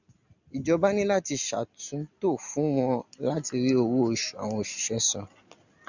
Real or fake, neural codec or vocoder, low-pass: real; none; 7.2 kHz